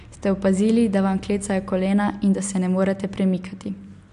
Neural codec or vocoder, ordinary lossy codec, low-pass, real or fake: none; MP3, 64 kbps; 10.8 kHz; real